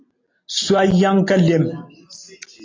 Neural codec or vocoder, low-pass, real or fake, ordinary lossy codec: none; 7.2 kHz; real; AAC, 32 kbps